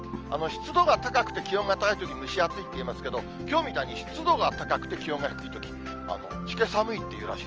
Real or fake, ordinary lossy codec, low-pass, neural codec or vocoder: real; Opus, 24 kbps; 7.2 kHz; none